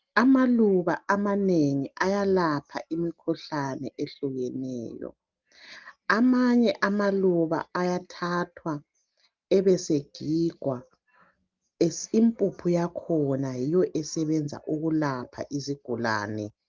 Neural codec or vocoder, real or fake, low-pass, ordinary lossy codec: none; real; 7.2 kHz; Opus, 32 kbps